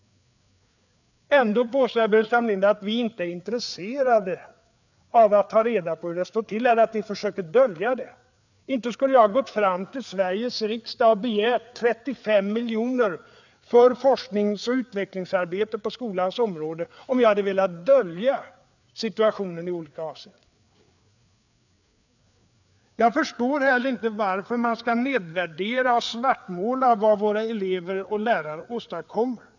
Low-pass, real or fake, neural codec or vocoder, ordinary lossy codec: 7.2 kHz; fake; codec, 16 kHz, 4 kbps, FreqCodec, larger model; none